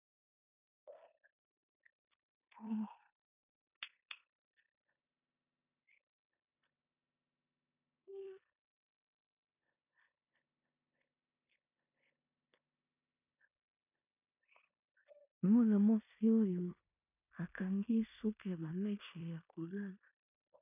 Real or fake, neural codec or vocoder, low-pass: fake; codec, 16 kHz in and 24 kHz out, 0.9 kbps, LongCat-Audio-Codec, four codebook decoder; 3.6 kHz